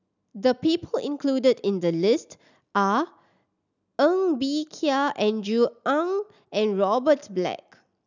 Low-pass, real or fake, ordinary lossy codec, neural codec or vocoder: 7.2 kHz; real; none; none